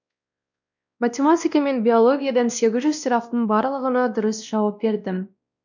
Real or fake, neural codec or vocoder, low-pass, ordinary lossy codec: fake; codec, 16 kHz, 1 kbps, X-Codec, WavLM features, trained on Multilingual LibriSpeech; 7.2 kHz; none